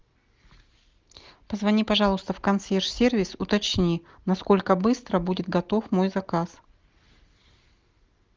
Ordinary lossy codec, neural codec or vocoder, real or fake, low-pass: Opus, 32 kbps; none; real; 7.2 kHz